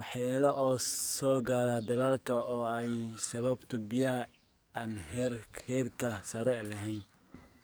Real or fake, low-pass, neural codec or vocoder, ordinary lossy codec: fake; none; codec, 44.1 kHz, 2.6 kbps, SNAC; none